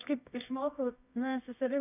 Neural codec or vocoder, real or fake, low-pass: codec, 24 kHz, 0.9 kbps, WavTokenizer, medium music audio release; fake; 3.6 kHz